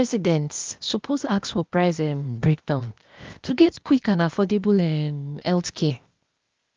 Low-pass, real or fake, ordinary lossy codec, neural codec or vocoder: 7.2 kHz; fake; Opus, 24 kbps; codec, 16 kHz, 0.8 kbps, ZipCodec